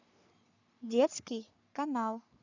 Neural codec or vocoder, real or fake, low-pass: codec, 44.1 kHz, 3.4 kbps, Pupu-Codec; fake; 7.2 kHz